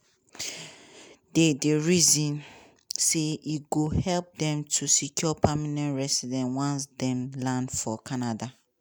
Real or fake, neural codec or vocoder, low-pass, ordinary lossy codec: real; none; none; none